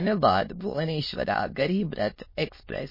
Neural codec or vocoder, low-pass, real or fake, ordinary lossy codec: autoencoder, 22.05 kHz, a latent of 192 numbers a frame, VITS, trained on many speakers; 5.4 kHz; fake; MP3, 24 kbps